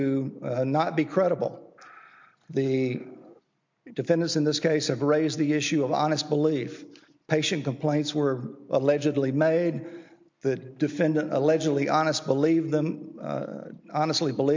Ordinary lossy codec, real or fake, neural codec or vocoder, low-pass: MP3, 48 kbps; real; none; 7.2 kHz